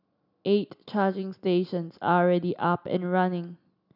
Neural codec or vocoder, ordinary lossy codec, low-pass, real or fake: none; none; 5.4 kHz; real